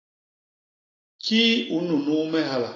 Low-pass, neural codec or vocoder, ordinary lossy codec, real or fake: 7.2 kHz; none; AAC, 32 kbps; real